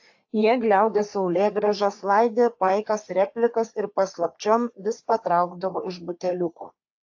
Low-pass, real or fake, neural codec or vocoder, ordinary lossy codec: 7.2 kHz; fake; codec, 44.1 kHz, 3.4 kbps, Pupu-Codec; AAC, 48 kbps